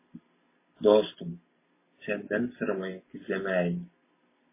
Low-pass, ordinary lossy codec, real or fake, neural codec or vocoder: 3.6 kHz; MP3, 16 kbps; real; none